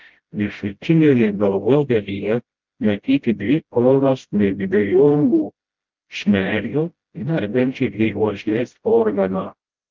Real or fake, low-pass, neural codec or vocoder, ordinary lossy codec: fake; 7.2 kHz; codec, 16 kHz, 0.5 kbps, FreqCodec, smaller model; Opus, 24 kbps